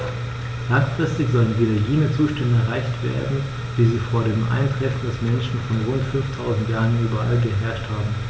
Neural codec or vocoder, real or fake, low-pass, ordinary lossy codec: none; real; none; none